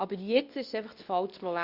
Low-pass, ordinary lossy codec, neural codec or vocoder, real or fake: 5.4 kHz; Opus, 64 kbps; codec, 24 kHz, 0.9 kbps, WavTokenizer, medium speech release version 2; fake